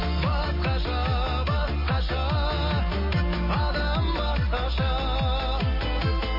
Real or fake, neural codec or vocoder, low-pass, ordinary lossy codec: real; none; 5.4 kHz; MP3, 24 kbps